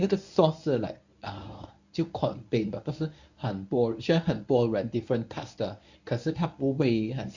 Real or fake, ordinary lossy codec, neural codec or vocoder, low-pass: fake; none; codec, 24 kHz, 0.9 kbps, WavTokenizer, medium speech release version 1; 7.2 kHz